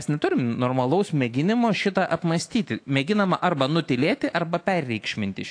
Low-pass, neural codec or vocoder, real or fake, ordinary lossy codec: 9.9 kHz; autoencoder, 48 kHz, 128 numbers a frame, DAC-VAE, trained on Japanese speech; fake; AAC, 48 kbps